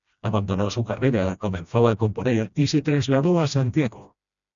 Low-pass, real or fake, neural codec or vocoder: 7.2 kHz; fake; codec, 16 kHz, 1 kbps, FreqCodec, smaller model